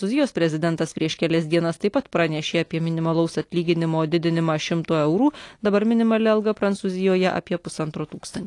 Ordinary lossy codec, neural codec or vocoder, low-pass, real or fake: AAC, 48 kbps; none; 10.8 kHz; real